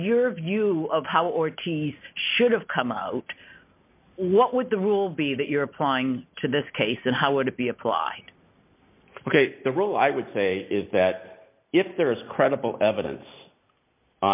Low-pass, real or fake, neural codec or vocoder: 3.6 kHz; real; none